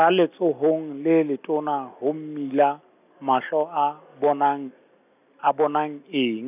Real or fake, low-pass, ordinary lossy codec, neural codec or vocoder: real; 3.6 kHz; none; none